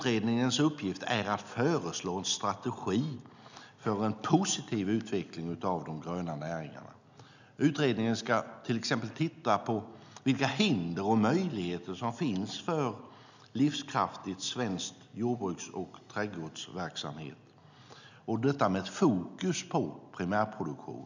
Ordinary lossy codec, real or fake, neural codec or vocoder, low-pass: none; real; none; 7.2 kHz